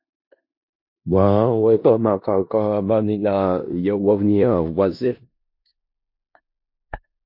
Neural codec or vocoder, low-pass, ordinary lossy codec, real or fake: codec, 16 kHz in and 24 kHz out, 0.4 kbps, LongCat-Audio-Codec, four codebook decoder; 5.4 kHz; MP3, 32 kbps; fake